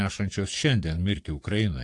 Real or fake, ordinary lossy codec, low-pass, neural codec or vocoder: fake; AAC, 48 kbps; 10.8 kHz; codec, 44.1 kHz, 7.8 kbps, DAC